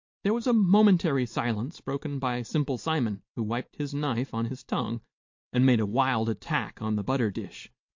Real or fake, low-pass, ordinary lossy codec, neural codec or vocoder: real; 7.2 kHz; MP3, 48 kbps; none